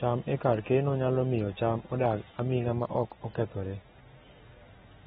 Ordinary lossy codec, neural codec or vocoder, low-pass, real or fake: AAC, 16 kbps; none; 7.2 kHz; real